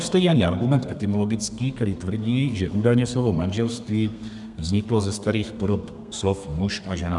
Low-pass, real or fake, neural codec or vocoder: 10.8 kHz; fake; codec, 32 kHz, 1.9 kbps, SNAC